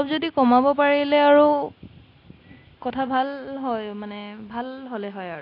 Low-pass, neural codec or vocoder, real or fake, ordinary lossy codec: 5.4 kHz; none; real; AAC, 32 kbps